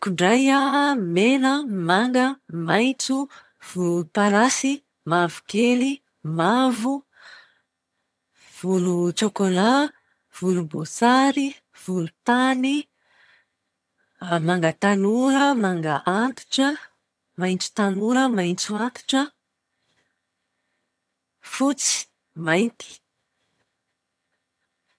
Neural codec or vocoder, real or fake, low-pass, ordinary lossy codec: vocoder, 22.05 kHz, 80 mel bands, HiFi-GAN; fake; none; none